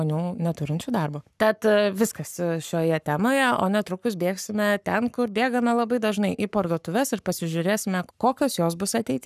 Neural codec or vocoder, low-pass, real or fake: codec, 44.1 kHz, 7.8 kbps, Pupu-Codec; 14.4 kHz; fake